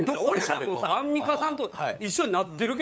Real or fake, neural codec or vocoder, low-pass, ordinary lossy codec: fake; codec, 16 kHz, 8 kbps, FunCodec, trained on LibriTTS, 25 frames a second; none; none